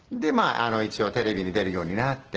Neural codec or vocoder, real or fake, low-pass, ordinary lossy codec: none; real; 7.2 kHz; Opus, 16 kbps